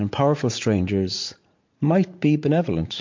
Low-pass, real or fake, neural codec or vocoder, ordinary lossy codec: 7.2 kHz; fake; vocoder, 44.1 kHz, 80 mel bands, Vocos; MP3, 48 kbps